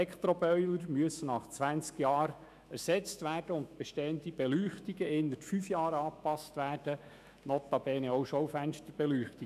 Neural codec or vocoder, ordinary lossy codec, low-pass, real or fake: autoencoder, 48 kHz, 128 numbers a frame, DAC-VAE, trained on Japanese speech; none; 14.4 kHz; fake